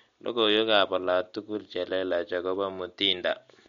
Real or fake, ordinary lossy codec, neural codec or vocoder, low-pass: real; MP3, 48 kbps; none; 7.2 kHz